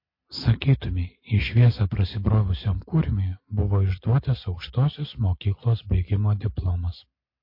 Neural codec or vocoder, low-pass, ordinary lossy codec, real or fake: none; 5.4 kHz; MP3, 32 kbps; real